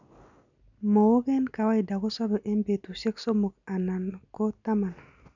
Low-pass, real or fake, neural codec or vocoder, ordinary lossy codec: 7.2 kHz; real; none; none